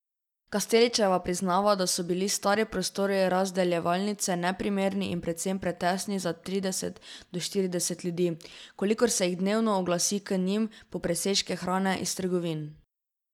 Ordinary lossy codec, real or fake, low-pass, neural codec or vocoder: none; real; 19.8 kHz; none